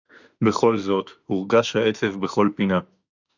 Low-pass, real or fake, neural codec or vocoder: 7.2 kHz; fake; autoencoder, 48 kHz, 32 numbers a frame, DAC-VAE, trained on Japanese speech